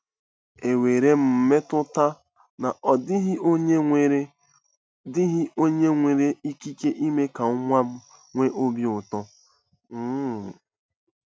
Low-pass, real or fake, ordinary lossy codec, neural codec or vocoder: none; real; none; none